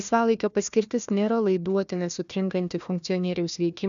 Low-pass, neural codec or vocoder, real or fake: 7.2 kHz; codec, 16 kHz, 1 kbps, FunCodec, trained on Chinese and English, 50 frames a second; fake